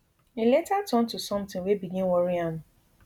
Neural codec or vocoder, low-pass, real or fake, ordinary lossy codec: none; 19.8 kHz; real; none